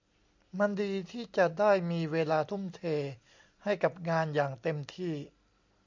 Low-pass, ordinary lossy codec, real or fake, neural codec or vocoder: 7.2 kHz; MP3, 64 kbps; real; none